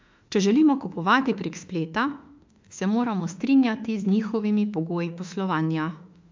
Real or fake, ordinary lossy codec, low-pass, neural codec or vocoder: fake; MP3, 64 kbps; 7.2 kHz; autoencoder, 48 kHz, 32 numbers a frame, DAC-VAE, trained on Japanese speech